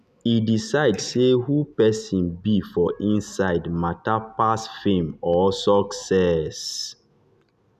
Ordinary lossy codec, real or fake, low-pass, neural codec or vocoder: none; real; 14.4 kHz; none